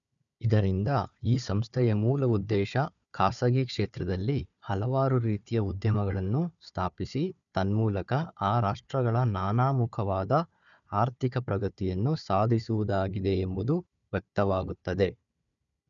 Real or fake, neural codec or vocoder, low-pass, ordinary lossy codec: fake; codec, 16 kHz, 4 kbps, FunCodec, trained on Chinese and English, 50 frames a second; 7.2 kHz; none